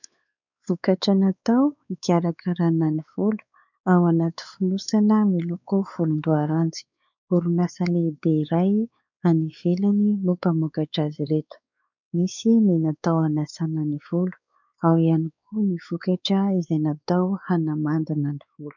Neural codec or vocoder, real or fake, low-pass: codec, 16 kHz, 4 kbps, X-Codec, WavLM features, trained on Multilingual LibriSpeech; fake; 7.2 kHz